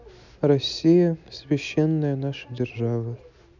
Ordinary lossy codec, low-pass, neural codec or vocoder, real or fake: none; 7.2 kHz; none; real